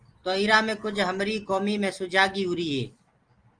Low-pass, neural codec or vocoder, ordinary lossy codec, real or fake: 9.9 kHz; none; Opus, 24 kbps; real